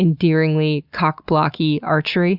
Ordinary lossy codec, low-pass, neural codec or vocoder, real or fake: Opus, 64 kbps; 5.4 kHz; none; real